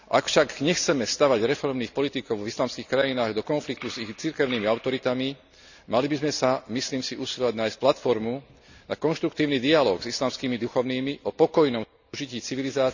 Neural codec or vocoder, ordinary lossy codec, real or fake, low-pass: none; none; real; 7.2 kHz